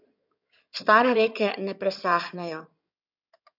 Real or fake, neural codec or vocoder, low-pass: fake; codec, 16 kHz in and 24 kHz out, 2.2 kbps, FireRedTTS-2 codec; 5.4 kHz